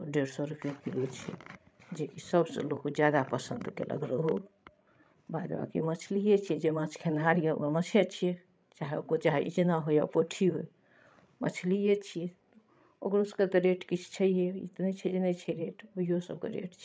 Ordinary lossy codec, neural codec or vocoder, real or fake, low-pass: none; codec, 16 kHz, 16 kbps, FreqCodec, larger model; fake; none